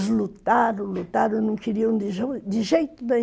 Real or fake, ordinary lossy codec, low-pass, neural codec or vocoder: real; none; none; none